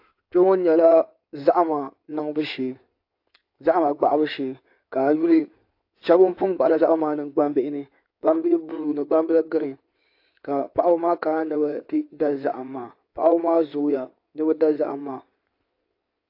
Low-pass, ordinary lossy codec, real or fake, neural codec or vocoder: 5.4 kHz; AAC, 32 kbps; fake; codec, 16 kHz in and 24 kHz out, 2.2 kbps, FireRedTTS-2 codec